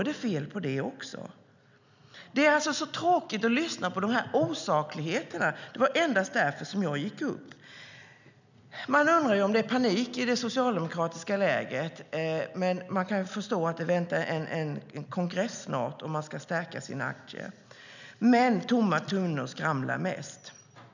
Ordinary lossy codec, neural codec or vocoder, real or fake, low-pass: none; none; real; 7.2 kHz